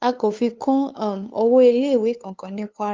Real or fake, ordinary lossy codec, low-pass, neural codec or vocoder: fake; Opus, 24 kbps; 7.2 kHz; codec, 16 kHz, 2 kbps, FunCodec, trained on LibriTTS, 25 frames a second